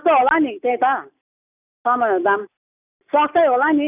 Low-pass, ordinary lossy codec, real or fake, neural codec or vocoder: 3.6 kHz; none; real; none